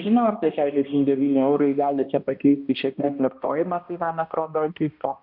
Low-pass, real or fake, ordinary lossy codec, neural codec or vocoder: 5.4 kHz; fake; AAC, 48 kbps; codec, 16 kHz, 1 kbps, X-Codec, HuBERT features, trained on balanced general audio